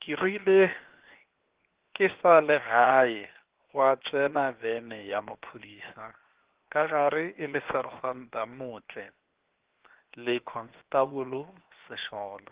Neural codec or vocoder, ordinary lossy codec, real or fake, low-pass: codec, 16 kHz, 0.7 kbps, FocalCodec; Opus, 16 kbps; fake; 3.6 kHz